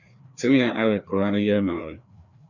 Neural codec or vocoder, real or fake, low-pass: codec, 16 kHz, 2 kbps, FreqCodec, larger model; fake; 7.2 kHz